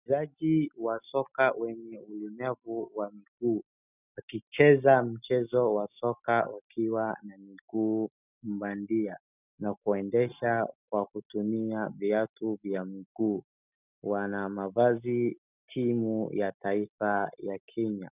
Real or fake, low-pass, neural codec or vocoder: real; 3.6 kHz; none